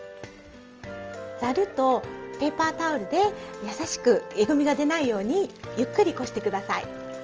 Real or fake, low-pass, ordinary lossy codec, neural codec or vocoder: real; 7.2 kHz; Opus, 24 kbps; none